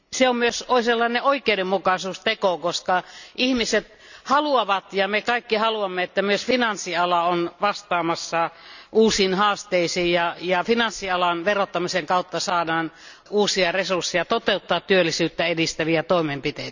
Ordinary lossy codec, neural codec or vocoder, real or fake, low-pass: none; none; real; 7.2 kHz